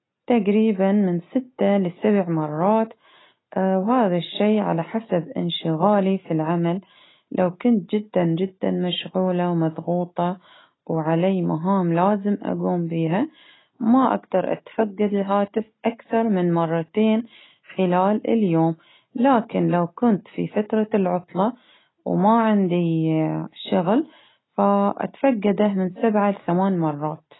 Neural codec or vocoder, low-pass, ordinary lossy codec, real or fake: none; 7.2 kHz; AAC, 16 kbps; real